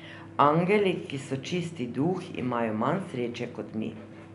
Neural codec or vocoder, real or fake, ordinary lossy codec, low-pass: none; real; none; 10.8 kHz